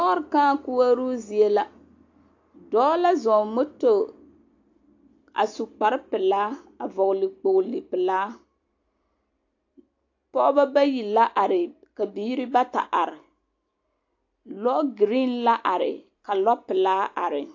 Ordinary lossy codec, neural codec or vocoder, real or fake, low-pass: AAC, 48 kbps; none; real; 7.2 kHz